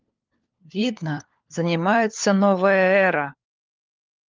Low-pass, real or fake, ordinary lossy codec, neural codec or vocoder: 7.2 kHz; fake; Opus, 32 kbps; codec, 16 kHz, 4 kbps, FunCodec, trained on LibriTTS, 50 frames a second